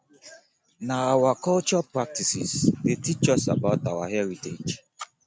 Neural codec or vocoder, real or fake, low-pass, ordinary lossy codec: none; real; none; none